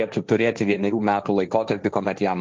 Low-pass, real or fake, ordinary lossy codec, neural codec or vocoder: 7.2 kHz; fake; Opus, 32 kbps; codec, 16 kHz, 1.1 kbps, Voila-Tokenizer